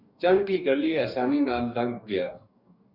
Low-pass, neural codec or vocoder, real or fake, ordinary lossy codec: 5.4 kHz; codec, 44.1 kHz, 2.6 kbps, DAC; fake; Opus, 64 kbps